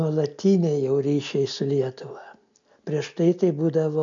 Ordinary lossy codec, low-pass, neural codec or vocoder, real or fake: AAC, 64 kbps; 7.2 kHz; none; real